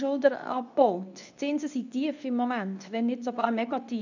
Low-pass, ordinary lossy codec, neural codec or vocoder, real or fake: 7.2 kHz; none; codec, 24 kHz, 0.9 kbps, WavTokenizer, medium speech release version 2; fake